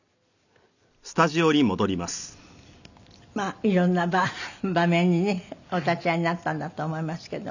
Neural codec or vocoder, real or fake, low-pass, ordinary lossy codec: none; real; 7.2 kHz; none